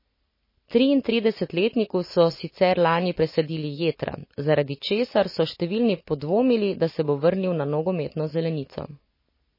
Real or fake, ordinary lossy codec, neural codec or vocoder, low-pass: real; MP3, 24 kbps; none; 5.4 kHz